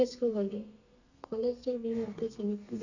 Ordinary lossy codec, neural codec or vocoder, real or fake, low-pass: none; codec, 32 kHz, 1.9 kbps, SNAC; fake; 7.2 kHz